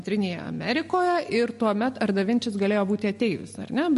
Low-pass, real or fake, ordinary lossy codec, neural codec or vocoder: 14.4 kHz; real; MP3, 48 kbps; none